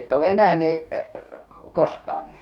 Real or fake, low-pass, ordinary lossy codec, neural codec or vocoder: fake; 19.8 kHz; none; codec, 44.1 kHz, 2.6 kbps, DAC